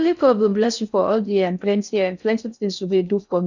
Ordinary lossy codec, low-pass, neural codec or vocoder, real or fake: none; 7.2 kHz; codec, 16 kHz in and 24 kHz out, 0.6 kbps, FocalCodec, streaming, 2048 codes; fake